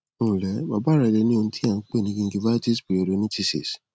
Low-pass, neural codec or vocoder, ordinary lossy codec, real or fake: none; none; none; real